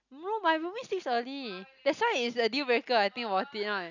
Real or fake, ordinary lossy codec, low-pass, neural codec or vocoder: real; none; 7.2 kHz; none